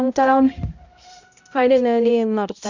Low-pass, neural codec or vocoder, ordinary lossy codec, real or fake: 7.2 kHz; codec, 16 kHz, 0.5 kbps, X-Codec, HuBERT features, trained on balanced general audio; none; fake